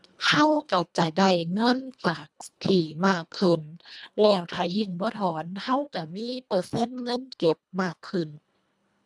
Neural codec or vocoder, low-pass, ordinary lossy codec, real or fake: codec, 24 kHz, 1.5 kbps, HILCodec; none; none; fake